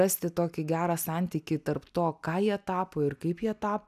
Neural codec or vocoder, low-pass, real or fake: none; 14.4 kHz; real